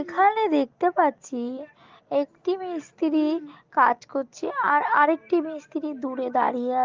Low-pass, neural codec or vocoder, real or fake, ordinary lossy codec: 7.2 kHz; none; real; Opus, 32 kbps